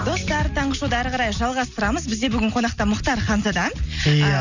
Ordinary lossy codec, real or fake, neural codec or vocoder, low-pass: none; real; none; 7.2 kHz